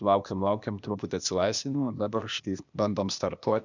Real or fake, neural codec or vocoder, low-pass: fake; codec, 16 kHz, 0.8 kbps, ZipCodec; 7.2 kHz